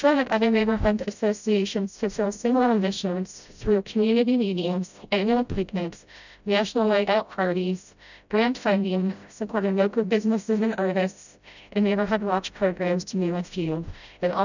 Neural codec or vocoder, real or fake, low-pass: codec, 16 kHz, 0.5 kbps, FreqCodec, smaller model; fake; 7.2 kHz